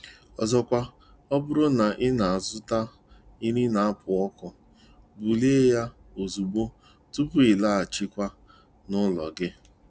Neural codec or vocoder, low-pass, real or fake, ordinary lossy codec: none; none; real; none